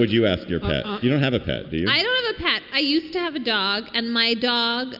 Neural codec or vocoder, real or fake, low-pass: none; real; 5.4 kHz